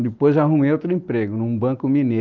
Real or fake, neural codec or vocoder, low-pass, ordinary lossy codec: real; none; 7.2 kHz; Opus, 32 kbps